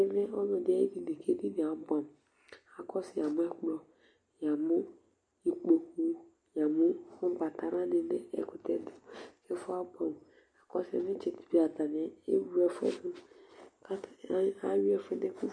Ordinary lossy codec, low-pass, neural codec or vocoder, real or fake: MP3, 48 kbps; 9.9 kHz; none; real